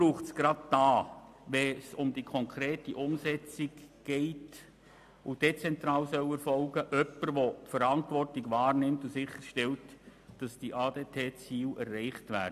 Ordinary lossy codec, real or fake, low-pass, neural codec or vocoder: AAC, 96 kbps; real; 14.4 kHz; none